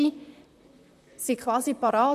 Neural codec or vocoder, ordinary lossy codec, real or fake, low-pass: vocoder, 44.1 kHz, 128 mel bands, Pupu-Vocoder; AAC, 96 kbps; fake; 14.4 kHz